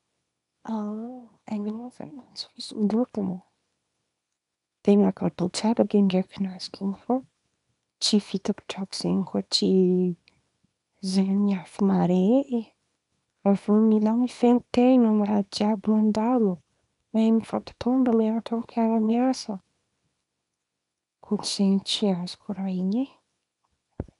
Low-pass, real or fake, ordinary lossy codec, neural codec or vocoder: 10.8 kHz; fake; none; codec, 24 kHz, 0.9 kbps, WavTokenizer, small release